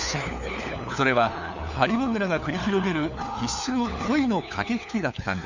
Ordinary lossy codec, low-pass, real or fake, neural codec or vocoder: none; 7.2 kHz; fake; codec, 16 kHz, 4 kbps, FunCodec, trained on LibriTTS, 50 frames a second